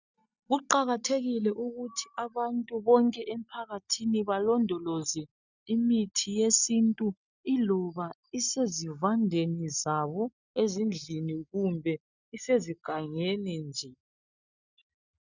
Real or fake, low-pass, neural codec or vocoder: real; 7.2 kHz; none